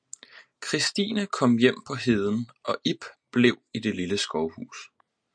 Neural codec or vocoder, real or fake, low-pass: none; real; 9.9 kHz